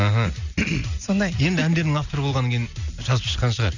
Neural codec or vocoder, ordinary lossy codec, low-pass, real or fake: none; none; 7.2 kHz; real